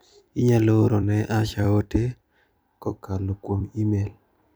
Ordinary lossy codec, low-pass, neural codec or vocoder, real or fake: none; none; vocoder, 44.1 kHz, 128 mel bands every 256 samples, BigVGAN v2; fake